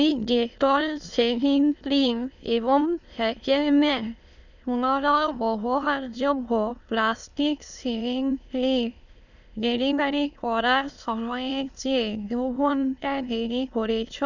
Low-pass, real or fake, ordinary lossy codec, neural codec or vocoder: 7.2 kHz; fake; none; autoencoder, 22.05 kHz, a latent of 192 numbers a frame, VITS, trained on many speakers